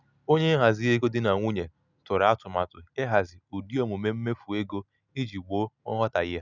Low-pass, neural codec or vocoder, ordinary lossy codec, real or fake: 7.2 kHz; none; none; real